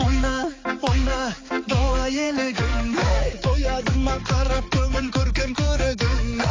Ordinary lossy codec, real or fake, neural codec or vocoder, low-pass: AAC, 32 kbps; fake; codec, 16 kHz, 4 kbps, X-Codec, HuBERT features, trained on balanced general audio; 7.2 kHz